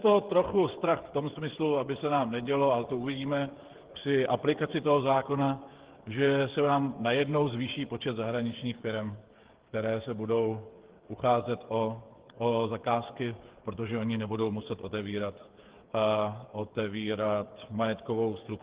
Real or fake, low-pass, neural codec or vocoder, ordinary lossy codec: fake; 3.6 kHz; codec, 16 kHz, 8 kbps, FreqCodec, smaller model; Opus, 16 kbps